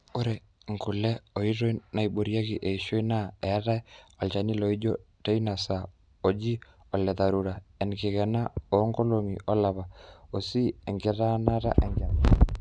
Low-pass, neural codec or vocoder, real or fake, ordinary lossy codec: 9.9 kHz; none; real; none